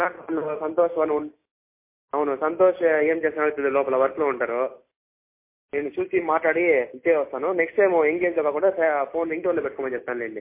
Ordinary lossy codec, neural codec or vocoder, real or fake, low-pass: MP3, 24 kbps; none; real; 3.6 kHz